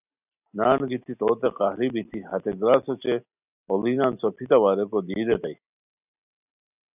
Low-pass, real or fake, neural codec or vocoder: 3.6 kHz; real; none